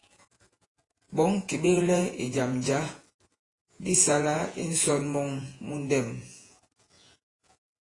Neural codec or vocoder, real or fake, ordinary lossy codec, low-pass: vocoder, 48 kHz, 128 mel bands, Vocos; fake; AAC, 32 kbps; 10.8 kHz